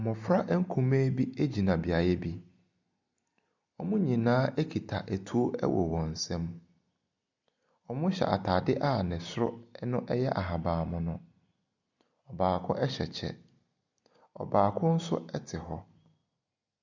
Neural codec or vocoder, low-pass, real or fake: none; 7.2 kHz; real